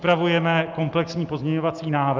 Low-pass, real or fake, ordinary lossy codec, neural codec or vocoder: 7.2 kHz; real; Opus, 24 kbps; none